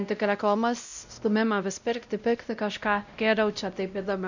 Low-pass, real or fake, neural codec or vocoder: 7.2 kHz; fake; codec, 16 kHz, 0.5 kbps, X-Codec, WavLM features, trained on Multilingual LibriSpeech